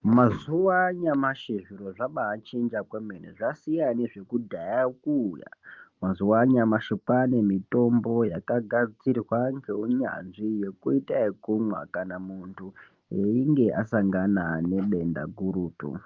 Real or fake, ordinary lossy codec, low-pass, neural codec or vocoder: real; Opus, 24 kbps; 7.2 kHz; none